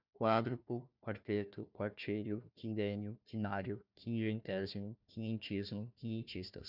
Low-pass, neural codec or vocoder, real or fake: 5.4 kHz; codec, 16 kHz, 1 kbps, FunCodec, trained on Chinese and English, 50 frames a second; fake